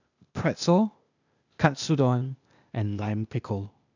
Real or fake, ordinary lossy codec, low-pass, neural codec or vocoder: fake; none; 7.2 kHz; codec, 16 kHz, 0.8 kbps, ZipCodec